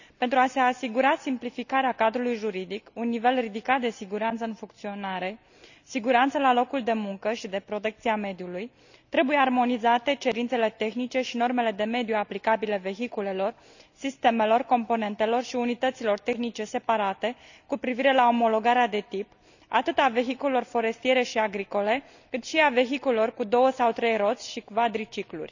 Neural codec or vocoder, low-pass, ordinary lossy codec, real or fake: none; 7.2 kHz; none; real